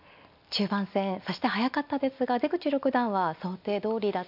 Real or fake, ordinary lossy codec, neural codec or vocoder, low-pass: real; AAC, 48 kbps; none; 5.4 kHz